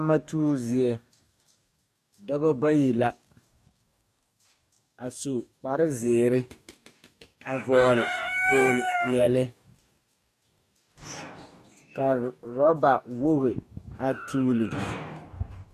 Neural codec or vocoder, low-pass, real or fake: codec, 44.1 kHz, 2.6 kbps, DAC; 14.4 kHz; fake